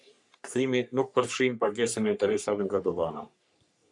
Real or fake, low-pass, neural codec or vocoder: fake; 10.8 kHz; codec, 44.1 kHz, 3.4 kbps, Pupu-Codec